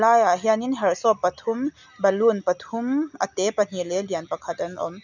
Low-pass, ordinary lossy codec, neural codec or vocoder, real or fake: 7.2 kHz; none; none; real